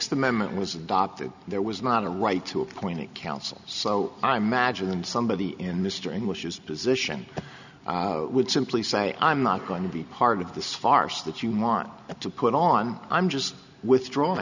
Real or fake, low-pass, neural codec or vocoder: real; 7.2 kHz; none